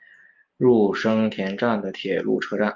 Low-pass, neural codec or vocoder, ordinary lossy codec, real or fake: 7.2 kHz; none; Opus, 24 kbps; real